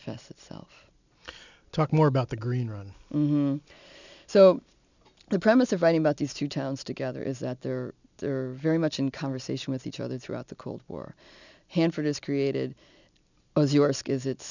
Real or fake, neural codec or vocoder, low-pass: real; none; 7.2 kHz